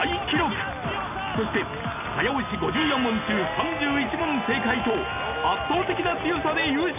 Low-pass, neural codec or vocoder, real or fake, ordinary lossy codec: 3.6 kHz; none; real; none